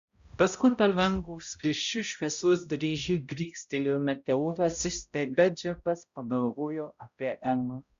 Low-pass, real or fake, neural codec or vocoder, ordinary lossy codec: 7.2 kHz; fake; codec, 16 kHz, 0.5 kbps, X-Codec, HuBERT features, trained on balanced general audio; Opus, 64 kbps